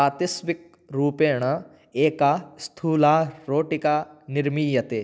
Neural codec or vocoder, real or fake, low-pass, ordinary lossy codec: none; real; none; none